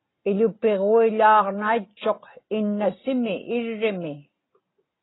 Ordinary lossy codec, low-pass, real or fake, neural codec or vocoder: AAC, 16 kbps; 7.2 kHz; real; none